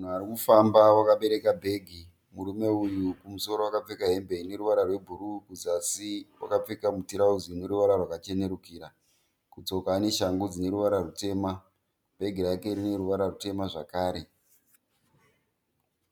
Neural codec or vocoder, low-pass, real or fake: none; 19.8 kHz; real